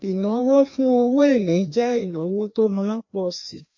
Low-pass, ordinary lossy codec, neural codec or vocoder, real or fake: 7.2 kHz; MP3, 48 kbps; codec, 16 kHz, 1 kbps, FreqCodec, larger model; fake